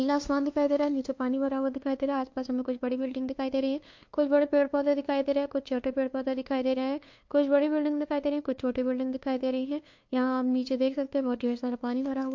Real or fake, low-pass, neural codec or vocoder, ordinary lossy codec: fake; 7.2 kHz; codec, 16 kHz, 2 kbps, FunCodec, trained on LibriTTS, 25 frames a second; MP3, 48 kbps